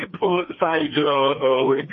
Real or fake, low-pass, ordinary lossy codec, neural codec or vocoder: fake; 7.2 kHz; MP3, 32 kbps; codec, 16 kHz, 2 kbps, FreqCodec, larger model